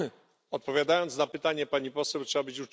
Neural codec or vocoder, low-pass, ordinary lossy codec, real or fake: none; none; none; real